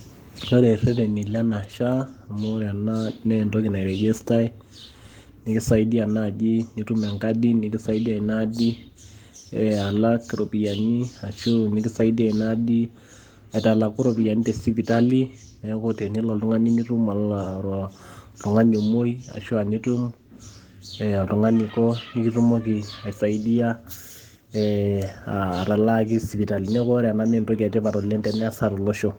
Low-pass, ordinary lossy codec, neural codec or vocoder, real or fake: 19.8 kHz; Opus, 24 kbps; codec, 44.1 kHz, 7.8 kbps, Pupu-Codec; fake